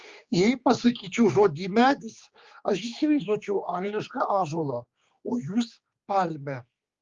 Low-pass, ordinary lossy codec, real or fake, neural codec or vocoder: 7.2 kHz; Opus, 32 kbps; fake; codec, 16 kHz, 2 kbps, X-Codec, HuBERT features, trained on general audio